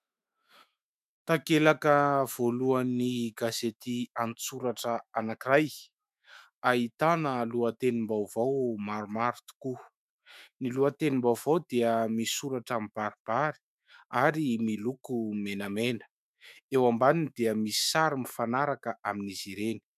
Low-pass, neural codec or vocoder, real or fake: 14.4 kHz; autoencoder, 48 kHz, 128 numbers a frame, DAC-VAE, trained on Japanese speech; fake